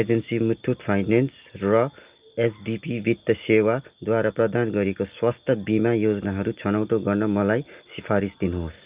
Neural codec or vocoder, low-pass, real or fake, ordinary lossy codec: none; 3.6 kHz; real; Opus, 24 kbps